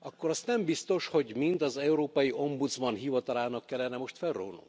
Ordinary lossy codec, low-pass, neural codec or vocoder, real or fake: none; none; none; real